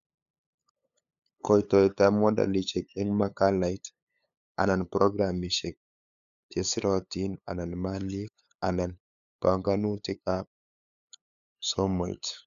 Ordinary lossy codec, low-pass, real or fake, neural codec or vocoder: none; 7.2 kHz; fake; codec, 16 kHz, 8 kbps, FunCodec, trained on LibriTTS, 25 frames a second